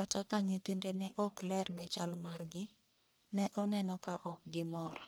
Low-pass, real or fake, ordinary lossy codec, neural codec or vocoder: none; fake; none; codec, 44.1 kHz, 1.7 kbps, Pupu-Codec